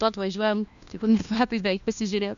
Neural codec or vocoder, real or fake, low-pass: codec, 16 kHz, 1 kbps, FunCodec, trained on LibriTTS, 50 frames a second; fake; 7.2 kHz